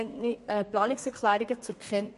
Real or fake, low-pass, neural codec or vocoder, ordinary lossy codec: fake; 14.4 kHz; codec, 32 kHz, 1.9 kbps, SNAC; MP3, 48 kbps